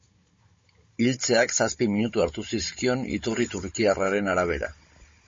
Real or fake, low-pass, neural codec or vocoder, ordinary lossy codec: fake; 7.2 kHz; codec, 16 kHz, 16 kbps, FunCodec, trained on Chinese and English, 50 frames a second; MP3, 32 kbps